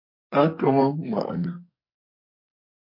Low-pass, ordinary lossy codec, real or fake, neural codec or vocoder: 5.4 kHz; MP3, 32 kbps; fake; codec, 44.1 kHz, 2.6 kbps, SNAC